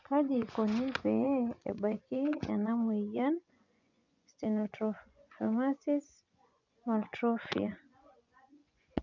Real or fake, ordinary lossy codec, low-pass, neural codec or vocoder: fake; none; 7.2 kHz; vocoder, 24 kHz, 100 mel bands, Vocos